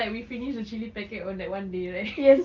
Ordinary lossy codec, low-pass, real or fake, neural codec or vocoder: Opus, 32 kbps; 7.2 kHz; real; none